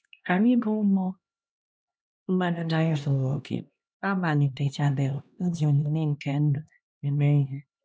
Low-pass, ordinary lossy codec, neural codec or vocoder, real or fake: none; none; codec, 16 kHz, 1 kbps, X-Codec, HuBERT features, trained on LibriSpeech; fake